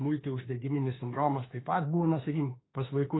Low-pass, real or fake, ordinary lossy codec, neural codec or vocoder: 7.2 kHz; fake; AAC, 16 kbps; autoencoder, 48 kHz, 32 numbers a frame, DAC-VAE, trained on Japanese speech